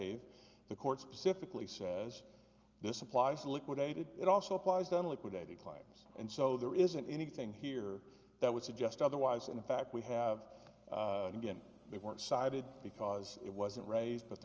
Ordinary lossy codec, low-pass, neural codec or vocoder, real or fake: Opus, 24 kbps; 7.2 kHz; none; real